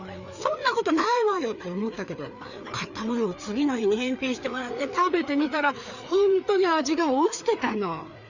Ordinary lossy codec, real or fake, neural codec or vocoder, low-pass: none; fake; codec, 16 kHz, 4 kbps, FreqCodec, larger model; 7.2 kHz